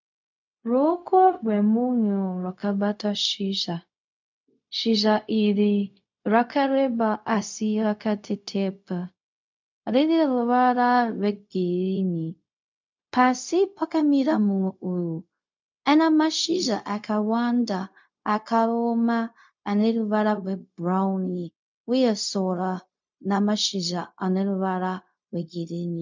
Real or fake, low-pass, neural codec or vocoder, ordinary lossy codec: fake; 7.2 kHz; codec, 16 kHz, 0.4 kbps, LongCat-Audio-Codec; MP3, 64 kbps